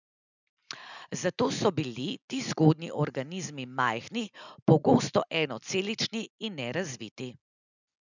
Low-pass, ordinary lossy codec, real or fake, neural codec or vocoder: 7.2 kHz; none; real; none